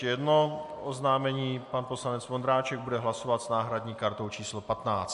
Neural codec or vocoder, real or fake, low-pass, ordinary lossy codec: none; real; 10.8 kHz; MP3, 64 kbps